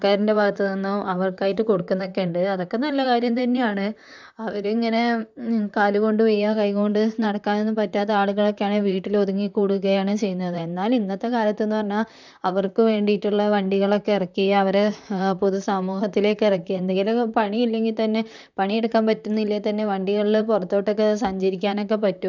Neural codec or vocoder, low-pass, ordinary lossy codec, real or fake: vocoder, 44.1 kHz, 128 mel bands, Pupu-Vocoder; 7.2 kHz; none; fake